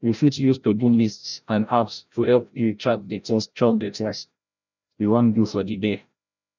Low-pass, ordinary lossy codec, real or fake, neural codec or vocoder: 7.2 kHz; none; fake; codec, 16 kHz, 0.5 kbps, FreqCodec, larger model